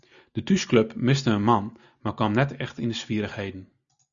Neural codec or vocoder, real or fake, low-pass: none; real; 7.2 kHz